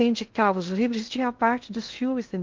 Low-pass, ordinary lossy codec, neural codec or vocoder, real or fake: 7.2 kHz; Opus, 32 kbps; codec, 16 kHz in and 24 kHz out, 0.6 kbps, FocalCodec, streaming, 2048 codes; fake